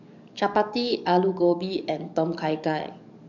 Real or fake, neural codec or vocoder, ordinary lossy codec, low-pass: fake; codec, 44.1 kHz, 7.8 kbps, DAC; none; 7.2 kHz